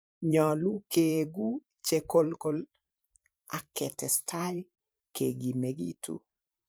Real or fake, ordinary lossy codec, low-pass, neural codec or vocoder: fake; none; none; vocoder, 44.1 kHz, 128 mel bands every 512 samples, BigVGAN v2